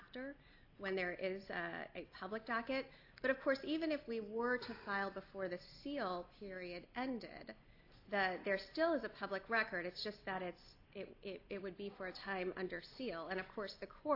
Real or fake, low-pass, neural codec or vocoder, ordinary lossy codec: real; 5.4 kHz; none; AAC, 32 kbps